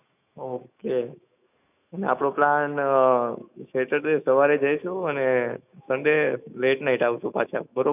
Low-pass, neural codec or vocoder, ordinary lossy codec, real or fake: 3.6 kHz; autoencoder, 48 kHz, 128 numbers a frame, DAC-VAE, trained on Japanese speech; none; fake